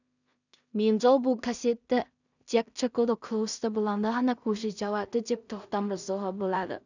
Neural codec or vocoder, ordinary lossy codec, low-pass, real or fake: codec, 16 kHz in and 24 kHz out, 0.4 kbps, LongCat-Audio-Codec, two codebook decoder; none; 7.2 kHz; fake